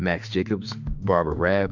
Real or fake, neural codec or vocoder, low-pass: fake; autoencoder, 48 kHz, 32 numbers a frame, DAC-VAE, trained on Japanese speech; 7.2 kHz